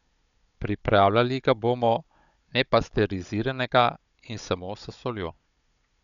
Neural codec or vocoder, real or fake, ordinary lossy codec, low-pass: codec, 16 kHz, 16 kbps, FunCodec, trained on Chinese and English, 50 frames a second; fake; none; 7.2 kHz